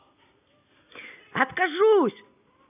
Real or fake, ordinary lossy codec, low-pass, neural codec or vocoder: real; none; 3.6 kHz; none